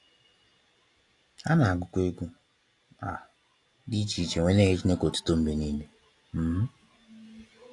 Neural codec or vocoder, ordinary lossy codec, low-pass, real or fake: none; AAC, 48 kbps; 10.8 kHz; real